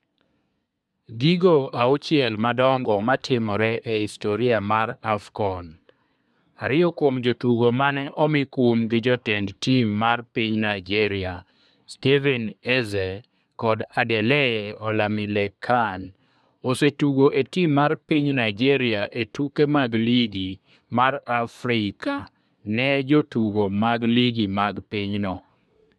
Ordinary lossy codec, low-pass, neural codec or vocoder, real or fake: none; none; codec, 24 kHz, 1 kbps, SNAC; fake